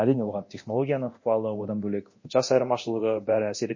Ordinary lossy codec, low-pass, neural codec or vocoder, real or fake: MP3, 32 kbps; 7.2 kHz; codec, 24 kHz, 0.9 kbps, DualCodec; fake